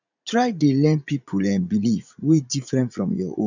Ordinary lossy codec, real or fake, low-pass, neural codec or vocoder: none; fake; 7.2 kHz; vocoder, 44.1 kHz, 80 mel bands, Vocos